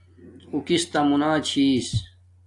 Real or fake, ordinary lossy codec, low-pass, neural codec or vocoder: real; AAC, 64 kbps; 10.8 kHz; none